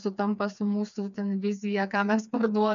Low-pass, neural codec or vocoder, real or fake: 7.2 kHz; codec, 16 kHz, 4 kbps, FreqCodec, smaller model; fake